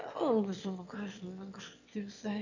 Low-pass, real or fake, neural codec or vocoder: 7.2 kHz; fake; autoencoder, 22.05 kHz, a latent of 192 numbers a frame, VITS, trained on one speaker